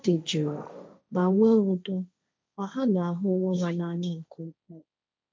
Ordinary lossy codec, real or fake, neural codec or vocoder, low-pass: none; fake; codec, 16 kHz, 1.1 kbps, Voila-Tokenizer; none